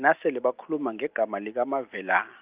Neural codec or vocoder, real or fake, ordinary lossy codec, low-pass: none; real; Opus, 32 kbps; 3.6 kHz